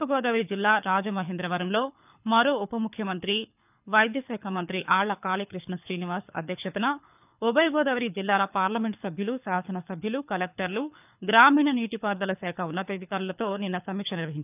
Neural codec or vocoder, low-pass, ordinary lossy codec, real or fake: codec, 24 kHz, 6 kbps, HILCodec; 3.6 kHz; none; fake